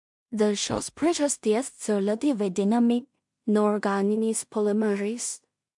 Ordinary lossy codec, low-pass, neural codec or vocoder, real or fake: MP3, 64 kbps; 10.8 kHz; codec, 16 kHz in and 24 kHz out, 0.4 kbps, LongCat-Audio-Codec, two codebook decoder; fake